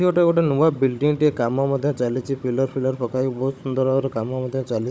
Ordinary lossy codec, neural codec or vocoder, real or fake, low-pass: none; codec, 16 kHz, 16 kbps, FunCodec, trained on Chinese and English, 50 frames a second; fake; none